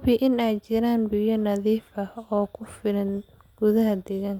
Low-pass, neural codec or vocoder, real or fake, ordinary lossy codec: 19.8 kHz; none; real; none